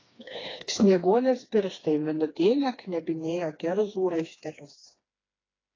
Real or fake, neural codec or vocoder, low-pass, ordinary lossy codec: fake; codec, 16 kHz, 2 kbps, FreqCodec, smaller model; 7.2 kHz; AAC, 32 kbps